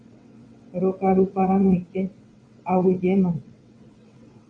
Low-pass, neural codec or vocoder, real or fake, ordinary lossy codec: 9.9 kHz; vocoder, 22.05 kHz, 80 mel bands, WaveNeXt; fake; MP3, 64 kbps